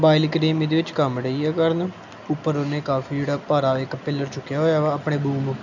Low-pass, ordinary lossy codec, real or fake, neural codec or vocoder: 7.2 kHz; none; real; none